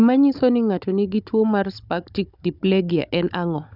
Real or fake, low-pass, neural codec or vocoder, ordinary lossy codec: fake; 5.4 kHz; codec, 16 kHz, 16 kbps, FunCodec, trained on Chinese and English, 50 frames a second; none